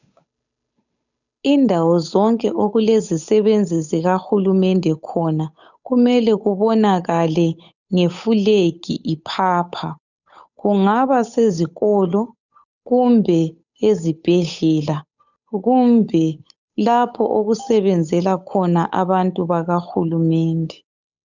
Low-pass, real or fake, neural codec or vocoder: 7.2 kHz; fake; codec, 16 kHz, 8 kbps, FunCodec, trained on Chinese and English, 25 frames a second